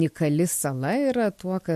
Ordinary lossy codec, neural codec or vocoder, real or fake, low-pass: MP3, 64 kbps; none; real; 14.4 kHz